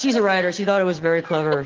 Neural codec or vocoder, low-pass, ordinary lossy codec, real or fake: none; 7.2 kHz; Opus, 16 kbps; real